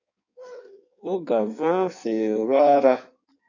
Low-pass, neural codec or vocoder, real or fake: 7.2 kHz; codec, 16 kHz in and 24 kHz out, 1.1 kbps, FireRedTTS-2 codec; fake